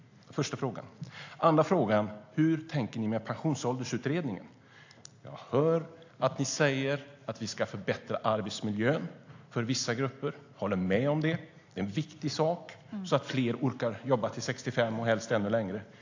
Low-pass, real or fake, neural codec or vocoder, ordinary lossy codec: 7.2 kHz; real; none; AAC, 48 kbps